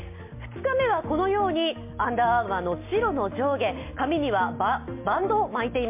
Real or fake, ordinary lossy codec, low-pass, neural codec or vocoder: real; MP3, 24 kbps; 3.6 kHz; none